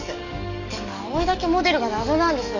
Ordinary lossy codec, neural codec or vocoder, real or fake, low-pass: none; codec, 44.1 kHz, 7.8 kbps, DAC; fake; 7.2 kHz